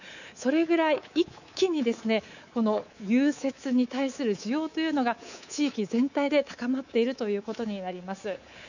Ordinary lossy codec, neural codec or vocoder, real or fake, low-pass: none; codec, 24 kHz, 3.1 kbps, DualCodec; fake; 7.2 kHz